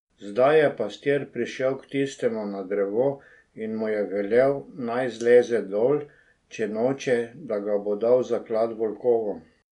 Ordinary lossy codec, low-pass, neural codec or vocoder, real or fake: none; 10.8 kHz; none; real